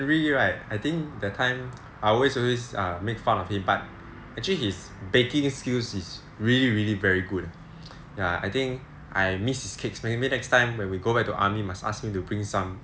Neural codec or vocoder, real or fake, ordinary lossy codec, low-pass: none; real; none; none